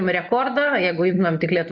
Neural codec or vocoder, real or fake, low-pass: none; real; 7.2 kHz